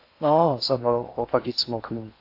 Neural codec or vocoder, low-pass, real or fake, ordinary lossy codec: codec, 16 kHz in and 24 kHz out, 0.6 kbps, FocalCodec, streaming, 4096 codes; 5.4 kHz; fake; AAC, 32 kbps